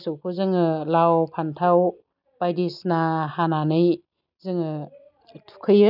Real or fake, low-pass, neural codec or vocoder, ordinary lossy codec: real; 5.4 kHz; none; none